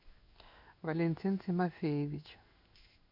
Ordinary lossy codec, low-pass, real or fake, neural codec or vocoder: MP3, 48 kbps; 5.4 kHz; fake; codec, 16 kHz in and 24 kHz out, 1 kbps, XY-Tokenizer